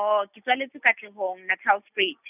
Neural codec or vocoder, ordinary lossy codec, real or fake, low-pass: none; none; real; 3.6 kHz